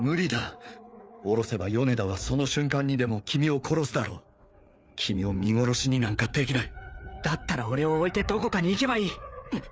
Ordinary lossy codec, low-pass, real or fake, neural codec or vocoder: none; none; fake; codec, 16 kHz, 4 kbps, FreqCodec, larger model